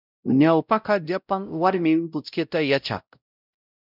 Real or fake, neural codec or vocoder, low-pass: fake; codec, 16 kHz, 0.5 kbps, X-Codec, WavLM features, trained on Multilingual LibriSpeech; 5.4 kHz